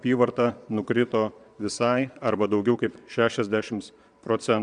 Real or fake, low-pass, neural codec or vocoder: fake; 9.9 kHz; vocoder, 22.05 kHz, 80 mel bands, Vocos